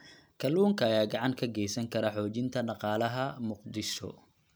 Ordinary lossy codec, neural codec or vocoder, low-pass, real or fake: none; none; none; real